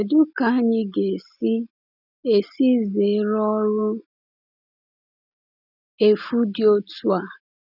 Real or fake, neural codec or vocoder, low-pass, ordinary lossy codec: real; none; 5.4 kHz; none